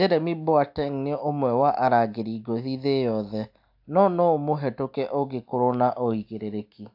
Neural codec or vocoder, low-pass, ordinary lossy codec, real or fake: none; 5.4 kHz; AAC, 48 kbps; real